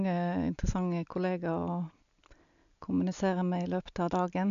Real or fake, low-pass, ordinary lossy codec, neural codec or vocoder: real; 7.2 kHz; MP3, 96 kbps; none